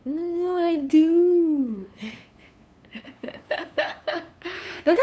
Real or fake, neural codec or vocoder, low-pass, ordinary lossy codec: fake; codec, 16 kHz, 2 kbps, FunCodec, trained on LibriTTS, 25 frames a second; none; none